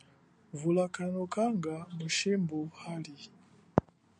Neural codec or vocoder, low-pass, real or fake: none; 9.9 kHz; real